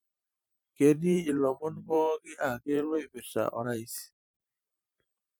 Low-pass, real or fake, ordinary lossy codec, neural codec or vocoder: none; fake; none; vocoder, 44.1 kHz, 128 mel bands every 512 samples, BigVGAN v2